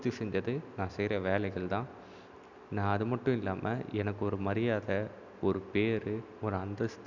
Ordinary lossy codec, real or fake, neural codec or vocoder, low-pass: none; real; none; 7.2 kHz